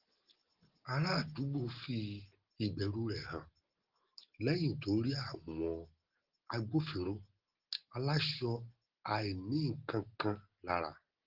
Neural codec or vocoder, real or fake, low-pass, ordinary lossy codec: none; real; 5.4 kHz; Opus, 16 kbps